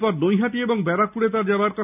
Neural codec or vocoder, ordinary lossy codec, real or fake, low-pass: none; none; real; 3.6 kHz